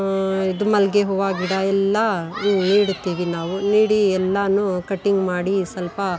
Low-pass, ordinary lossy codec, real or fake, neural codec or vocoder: none; none; real; none